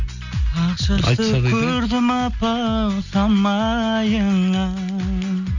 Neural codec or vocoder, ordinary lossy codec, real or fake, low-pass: none; none; real; 7.2 kHz